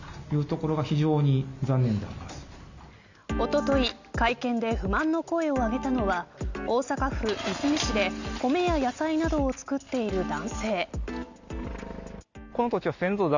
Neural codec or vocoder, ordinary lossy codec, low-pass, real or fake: none; none; 7.2 kHz; real